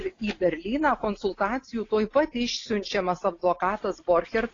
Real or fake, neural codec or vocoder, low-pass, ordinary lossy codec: real; none; 7.2 kHz; AAC, 32 kbps